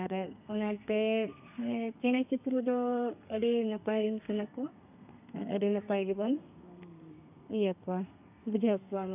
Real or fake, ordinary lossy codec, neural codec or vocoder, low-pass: fake; none; codec, 32 kHz, 1.9 kbps, SNAC; 3.6 kHz